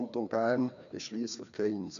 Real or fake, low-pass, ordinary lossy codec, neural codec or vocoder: fake; 7.2 kHz; AAC, 96 kbps; codec, 16 kHz, 2 kbps, FreqCodec, larger model